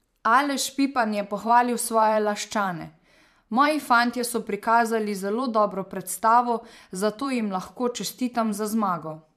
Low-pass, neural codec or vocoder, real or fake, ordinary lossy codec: 14.4 kHz; vocoder, 44.1 kHz, 128 mel bands every 512 samples, BigVGAN v2; fake; MP3, 96 kbps